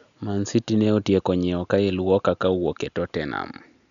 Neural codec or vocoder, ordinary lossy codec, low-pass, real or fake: none; none; 7.2 kHz; real